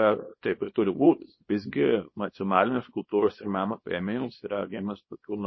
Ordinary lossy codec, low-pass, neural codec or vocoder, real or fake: MP3, 24 kbps; 7.2 kHz; codec, 24 kHz, 0.9 kbps, WavTokenizer, small release; fake